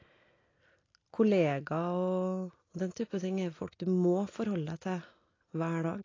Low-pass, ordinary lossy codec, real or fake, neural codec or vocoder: 7.2 kHz; AAC, 32 kbps; real; none